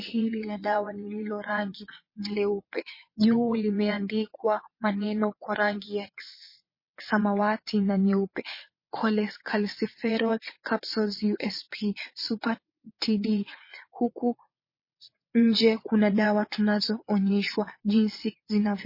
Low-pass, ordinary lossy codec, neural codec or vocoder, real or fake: 5.4 kHz; MP3, 24 kbps; vocoder, 22.05 kHz, 80 mel bands, WaveNeXt; fake